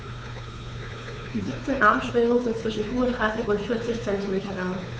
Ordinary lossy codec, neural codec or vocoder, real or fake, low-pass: none; codec, 16 kHz, 4 kbps, X-Codec, WavLM features, trained on Multilingual LibriSpeech; fake; none